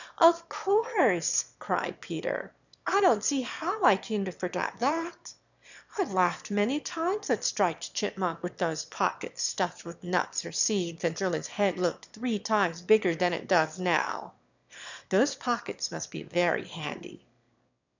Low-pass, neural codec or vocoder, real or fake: 7.2 kHz; autoencoder, 22.05 kHz, a latent of 192 numbers a frame, VITS, trained on one speaker; fake